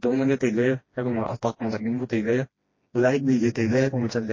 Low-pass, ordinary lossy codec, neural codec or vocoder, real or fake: 7.2 kHz; MP3, 32 kbps; codec, 16 kHz, 1 kbps, FreqCodec, smaller model; fake